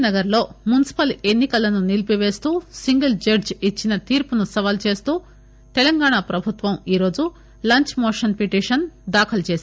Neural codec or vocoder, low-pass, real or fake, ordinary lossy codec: none; none; real; none